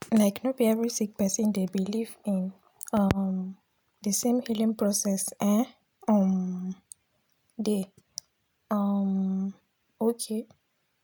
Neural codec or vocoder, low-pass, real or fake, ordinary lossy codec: none; none; real; none